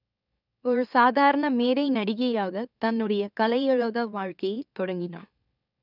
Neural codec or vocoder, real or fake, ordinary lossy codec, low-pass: autoencoder, 44.1 kHz, a latent of 192 numbers a frame, MeloTTS; fake; none; 5.4 kHz